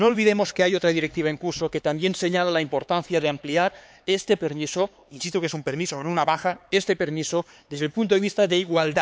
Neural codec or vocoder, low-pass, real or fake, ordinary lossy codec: codec, 16 kHz, 4 kbps, X-Codec, HuBERT features, trained on LibriSpeech; none; fake; none